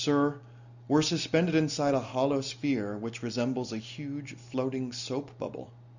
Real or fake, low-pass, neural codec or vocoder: real; 7.2 kHz; none